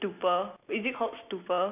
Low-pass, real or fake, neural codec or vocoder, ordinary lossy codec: 3.6 kHz; real; none; none